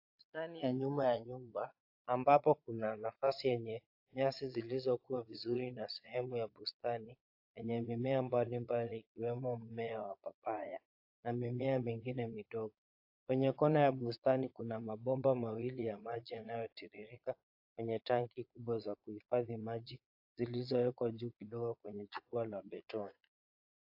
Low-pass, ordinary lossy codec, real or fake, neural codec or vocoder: 5.4 kHz; AAC, 48 kbps; fake; vocoder, 22.05 kHz, 80 mel bands, Vocos